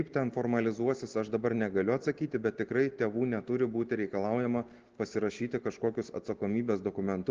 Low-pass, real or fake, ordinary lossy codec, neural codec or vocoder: 7.2 kHz; real; Opus, 16 kbps; none